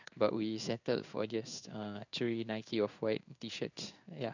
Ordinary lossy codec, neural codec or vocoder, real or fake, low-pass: none; codec, 16 kHz in and 24 kHz out, 1 kbps, XY-Tokenizer; fake; 7.2 kHz